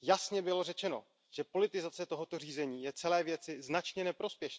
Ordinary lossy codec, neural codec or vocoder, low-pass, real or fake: none; none; none; real